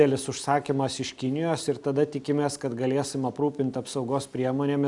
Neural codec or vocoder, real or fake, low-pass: none; real; 10.8 kHz